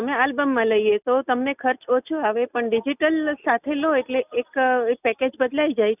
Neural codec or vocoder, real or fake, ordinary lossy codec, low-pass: none; real; none; 3.6 kHz